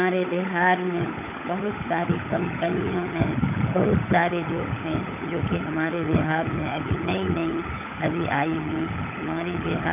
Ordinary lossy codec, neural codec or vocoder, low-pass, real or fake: none; codec, 16 kHz, 16 kbps, FunCodec, trained on LibriTTS, 50 frames a second; 3.6 kHz; fake